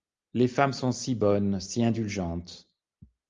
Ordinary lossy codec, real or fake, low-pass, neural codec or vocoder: Opus, 32 kbps; real; 7.2 kHz; none